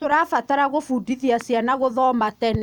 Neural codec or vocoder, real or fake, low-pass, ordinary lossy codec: vocoder, 44.1 kHz, 128 mel bands every 256 samples, BigVGAN v2; fake; 19.8 kHz; none